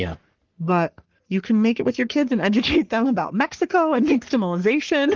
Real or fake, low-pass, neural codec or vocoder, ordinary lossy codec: fake; 7.2 kHz; codec, 44.1 kHz, 3.4 kbps, Pupu-Codec; Opus, 16 kbps